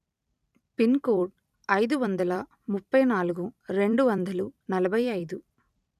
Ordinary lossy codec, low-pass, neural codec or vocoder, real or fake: none; 14.4 kHz; vocoder, 44.1 kHz, 128 mel bands every 256 samples, BigVGAN v2; fake